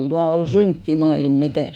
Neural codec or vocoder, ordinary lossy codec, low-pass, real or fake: autoencoder, 48 kHz, 32 numbers a frame, DAC-VAE, trained on Japanese speech; none; 19.8 kHz; fake